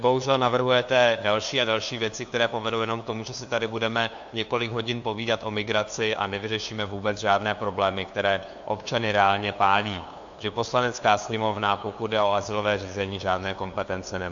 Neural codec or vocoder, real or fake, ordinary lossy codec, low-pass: codec, 16 kHz, 2 kbps, FunCodec, trained on LibriTTS, 25 frames a second; fake; AAC, 64 kbps; 7.2 kHz